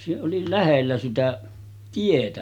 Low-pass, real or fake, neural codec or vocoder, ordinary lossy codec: 19.8 kHz; real; none; none